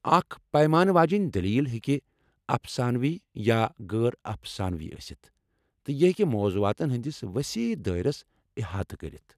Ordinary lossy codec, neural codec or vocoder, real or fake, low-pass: none; none; real; 14.4 kHz